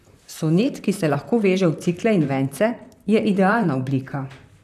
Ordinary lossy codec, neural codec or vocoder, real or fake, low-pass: none; vocoder, 44.1 kHz, 128 mel bands, Pupu-Vocoder; fake; 14.4 kHz